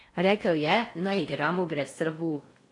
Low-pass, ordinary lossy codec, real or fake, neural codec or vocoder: 10.8 kHz; AAC, 48 kbps; fake; codec, 16 kHz in and 24 kHz out, 0.6 kbps, FocalCodec, streaming, 4096 codes